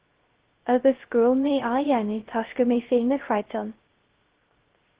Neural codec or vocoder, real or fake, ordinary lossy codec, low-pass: codec, 16 kHz, 0.3 kbps, FocalCodec; fake; Opus, 16 kbps; 3.6 kHz